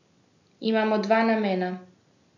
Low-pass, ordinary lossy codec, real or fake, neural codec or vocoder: 7.2 kHz; none; real; none